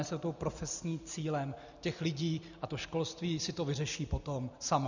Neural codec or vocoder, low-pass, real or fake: none; 7.2 kHz; real